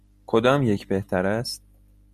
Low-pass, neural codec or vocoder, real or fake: 14.4 kHz; none; real